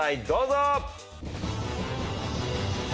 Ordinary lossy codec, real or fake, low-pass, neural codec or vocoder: none; real; none; none